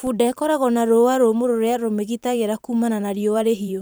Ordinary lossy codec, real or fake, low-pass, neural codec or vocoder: none; fake; none; vocoder, 44.1 kHz, 128 mel bands every 512 samples, BigVGAN v2